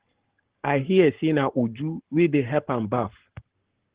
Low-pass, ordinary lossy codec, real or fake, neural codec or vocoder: 3.6 kHz; Opus, 16 kbps; fake; codec, 44.1 kHz, 7.8 kbps, Pupu-Codec